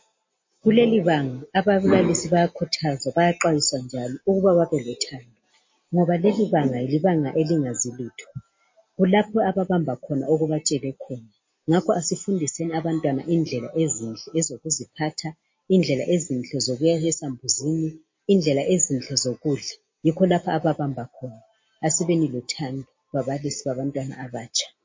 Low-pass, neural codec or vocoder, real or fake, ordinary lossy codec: 7.2 kHz; none; real; MP3, 32 kbps